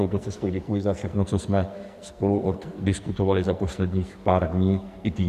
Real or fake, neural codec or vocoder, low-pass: fake; codec, 44.1 kHz, 2.6 kbps, SNAC; 14.4 kHz